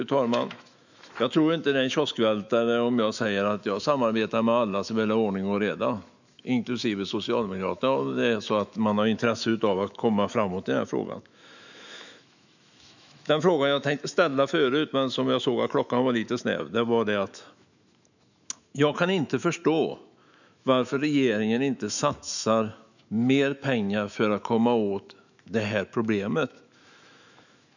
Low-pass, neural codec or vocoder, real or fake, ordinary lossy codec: 7.2 kHz; autoencoder, 48 kHz, 128 numbers a frame, DAC-VAE, trained on Japanese speech; fake; none